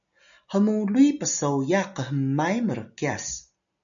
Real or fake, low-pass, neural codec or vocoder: real; 7.2 kHz; none